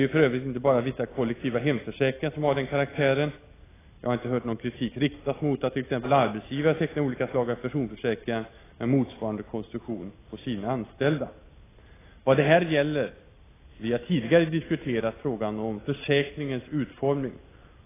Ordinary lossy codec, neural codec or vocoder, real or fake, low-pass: AAC, 16 kbps; none; real; 3.6 kHz